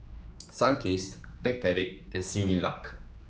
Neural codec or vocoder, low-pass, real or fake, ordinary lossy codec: codec, 16 kHz, 2 kbps, X-Codec, HuBERT features, trained on general audio; none; fake; none